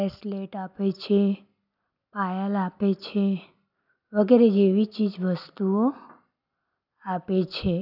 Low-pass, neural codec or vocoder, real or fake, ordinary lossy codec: 5.4 kHz; none; real; none